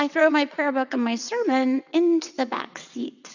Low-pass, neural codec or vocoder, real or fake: 7.2 kHz; vocoder, 22.05 kHz, 80 mel bands, WaveNeXt; fake